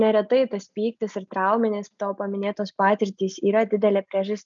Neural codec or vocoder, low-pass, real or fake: none; 7.2 kHz; real